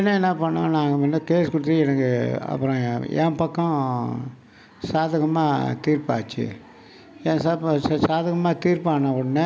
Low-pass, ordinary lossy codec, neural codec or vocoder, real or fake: none; none; none; real